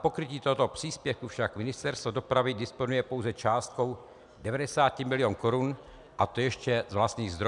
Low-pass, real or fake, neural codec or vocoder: 10.8 kHz; real; none